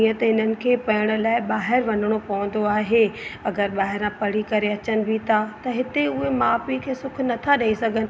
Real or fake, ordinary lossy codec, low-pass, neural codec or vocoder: real; none; none; none